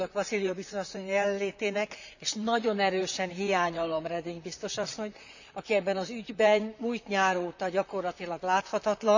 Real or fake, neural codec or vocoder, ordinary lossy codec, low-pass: fake; vocoder, 44.1 kHz, 128 mel bands, Pupu-Vocoder; none; 7.2 kHz